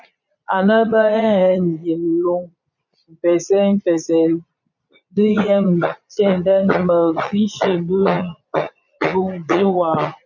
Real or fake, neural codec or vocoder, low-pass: fake; vocoder, 22.05 kHz, 80 mel bands, Vocos; 7.2 kHz